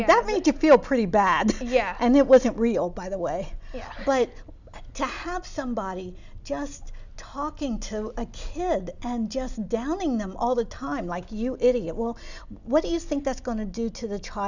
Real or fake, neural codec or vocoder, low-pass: real; none; 7.2 kHz